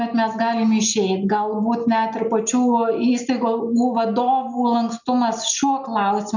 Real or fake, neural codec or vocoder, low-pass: real; none; 7.2 kHz